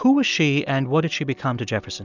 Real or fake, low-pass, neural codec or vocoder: real; 7.2 kHz; none